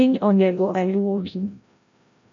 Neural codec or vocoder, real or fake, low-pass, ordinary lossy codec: codec, 16 kHz, 0.5 kbps, FreqCodec, larger model; fake; 7.2 kHz; AAC, 64 kbps